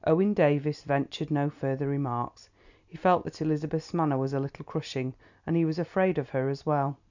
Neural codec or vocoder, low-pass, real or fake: none; 7.2 kHz; real